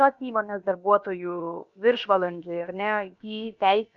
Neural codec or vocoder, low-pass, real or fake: codec, 16 kHz, about 1 kbps, DyCAST, with the encoder's durations; 7.2 kHz; fake